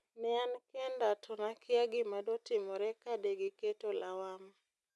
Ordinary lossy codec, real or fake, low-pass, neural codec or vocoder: none; real; none; none